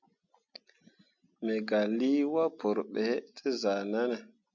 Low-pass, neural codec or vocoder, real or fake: 7.2 kHz; none; real